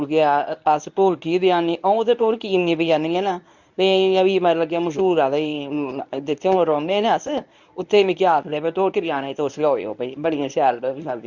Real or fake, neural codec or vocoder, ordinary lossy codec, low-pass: fake; codec, 24 kHz, 0.9 kbps, WavTokenizer, medium speech release version 1; none; 7.2 kHz